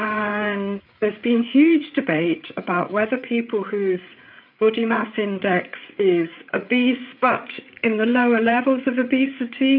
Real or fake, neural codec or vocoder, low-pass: fake; codec, 16 kHz, 8 kbps, FreqCodec, larger model; 5.4 kHz